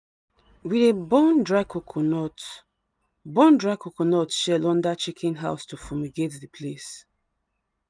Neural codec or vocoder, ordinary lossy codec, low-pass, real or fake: none; none; 9.9 kHz; real